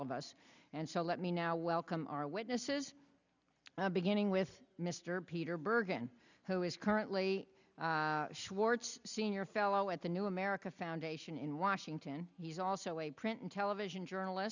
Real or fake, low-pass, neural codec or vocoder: real; 7.2 kHz; none